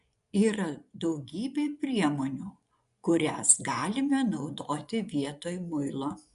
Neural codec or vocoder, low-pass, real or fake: vocoder, 24 kHz, 100 mel bands, Vocos; 10.8 kHz; fake